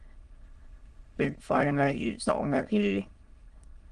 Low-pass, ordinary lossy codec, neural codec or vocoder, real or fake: 9.9 kHz; Opus, 32 kbps; autoencoder, 22.05 kHz, a latent of 192 numbers a frame, VITS, trained on many speakers; fake